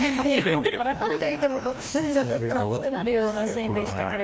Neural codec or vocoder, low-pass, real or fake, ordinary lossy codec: codec, 16 kHz, 1 kbps, FreqCodec, larger model; none; fake; none